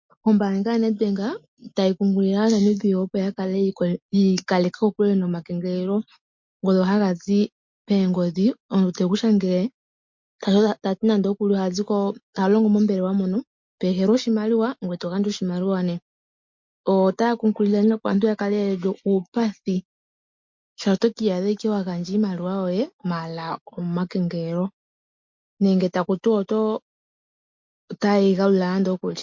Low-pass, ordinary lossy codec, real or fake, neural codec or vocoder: 7.2 kHz; MP3, 48 kbps; real; none